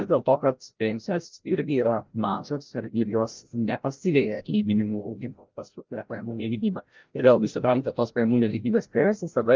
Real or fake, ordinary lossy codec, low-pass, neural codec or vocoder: fake; Opus, 32 kbps; 7.2 kHz; codec, 16 kHz, 0.5 kbps, FreqCodec, larger model